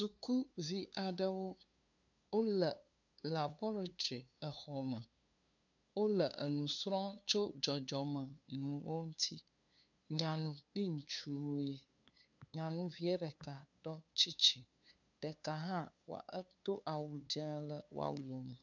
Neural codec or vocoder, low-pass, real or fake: codec, 16 kHz, 2 kbps, FunCodec, trained on LibriTTS, 25 frames a second; 7.2 kHz; fake